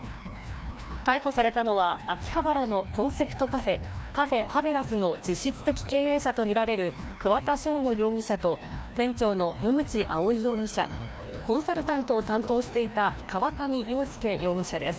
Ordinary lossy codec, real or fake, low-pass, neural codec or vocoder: none; fake; none; codec, 16 kHz, 1 kbps, FreqCodec, larger model